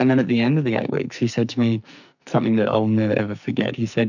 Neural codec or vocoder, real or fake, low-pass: codec, 44.1 kHz, 2.6 kbps, SNAC; fake; 7.2 kHz